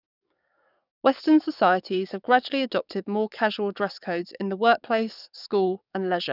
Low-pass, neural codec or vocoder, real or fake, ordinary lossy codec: 5.4 kHz; codec, 44.1 kHz, 7.8 kbps, DAC; fake; none